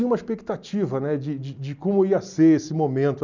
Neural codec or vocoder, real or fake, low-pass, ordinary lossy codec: none; real; 7.2 kHz; none